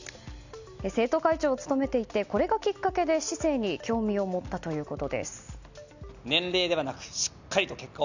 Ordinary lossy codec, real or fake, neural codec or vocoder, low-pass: none; real; none; 7.2 kHz